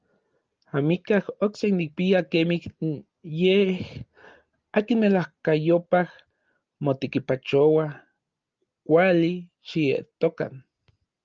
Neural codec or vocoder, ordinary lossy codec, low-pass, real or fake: none; Opus, 24 kbps; 7.2 kHz; real